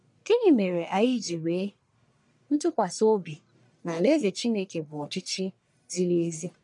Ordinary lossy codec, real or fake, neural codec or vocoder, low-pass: none; fake; codec, 44.1 kHz, 1.7 kbps, Pupu-Codec; 10.8 kHz